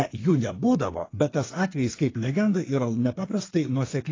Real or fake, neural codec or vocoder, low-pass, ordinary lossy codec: fake; codec, 32 kHz, 1.9 kbps, SNAC; 7.2 kHz; AAC, 32 kbps